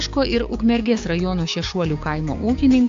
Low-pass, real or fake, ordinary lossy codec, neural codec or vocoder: 7.2 kHz; fake; AAC, 64 kbps; codec, 16 kHz, 6 kbps, DAC